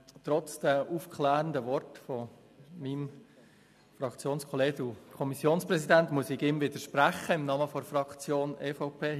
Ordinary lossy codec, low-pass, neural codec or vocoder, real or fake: MP3, 96 kbps; 14.4 kHz; none; real